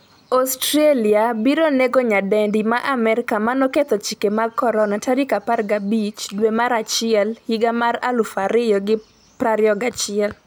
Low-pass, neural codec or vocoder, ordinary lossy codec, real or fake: none; none; none; real